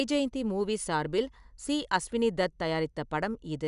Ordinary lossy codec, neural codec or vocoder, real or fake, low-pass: none; none; real; 10.8 kHz